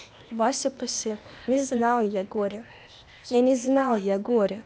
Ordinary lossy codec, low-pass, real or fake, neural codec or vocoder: none; none; fake; codec, 16 kHz, 0.8 kbps, ZipCodec